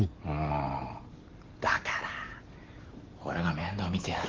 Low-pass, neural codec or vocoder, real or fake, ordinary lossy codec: 7.2 kHz; none; real; Opus, 16 kbps